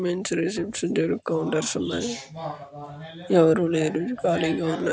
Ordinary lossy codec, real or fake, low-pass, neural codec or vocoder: none; real; none; none